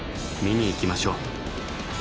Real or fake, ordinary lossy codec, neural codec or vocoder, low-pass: real; none; none; none